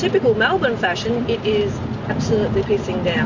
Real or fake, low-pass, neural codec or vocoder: real; 7.2 kHz; none